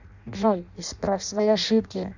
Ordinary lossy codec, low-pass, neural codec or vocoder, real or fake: none; 7.2 kHz; codec, 16 kHz in and 24 kHz out, 0.6 kbps, FireRedTTS-2 codec; fake